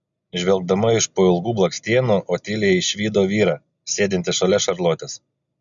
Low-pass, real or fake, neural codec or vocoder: 7.2 kHz; real; none